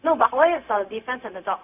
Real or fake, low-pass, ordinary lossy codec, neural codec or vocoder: fake; 3.6 kHz; none; codec, 16 kHz, 0.4 kbps, LongCat-Audio-Codec